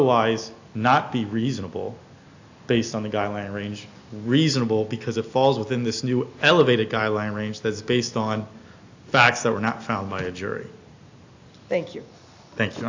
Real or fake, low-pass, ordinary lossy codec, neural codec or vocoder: real; 7.2 kHz; AAC, 48 kbps; none